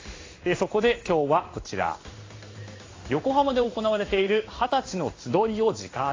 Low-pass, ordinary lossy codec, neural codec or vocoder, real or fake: 7.2 kHz; AAC, 32 kbps; codec, 16 kHz in and 24 kHz out, 1 kbps, XY-Tokenizer; fake